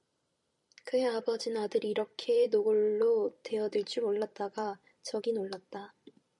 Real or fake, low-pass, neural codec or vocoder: fake; 10.8 kHz; vocoder, 44.1 kHz, 128 mel bands every 256 samples, BigVGAN v2